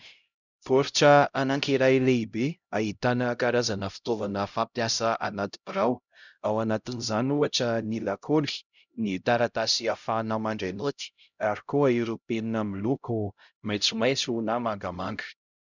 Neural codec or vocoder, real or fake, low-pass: codec, 16 kHz, 0.5 kbps, X-Codec, HuBERT features, trained on LibriSpeech; fake; 7.2 kHz